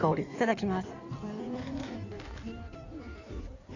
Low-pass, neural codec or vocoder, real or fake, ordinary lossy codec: 7.2 kHz; codec, 16 kHz in and 24 kHz out, 1.1 kbps, FireRedTTS-2 codec; fake; none